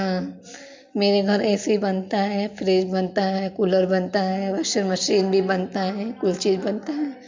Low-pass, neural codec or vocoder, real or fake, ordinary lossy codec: 7.2 kHz; none; real; MP3, 48 kbps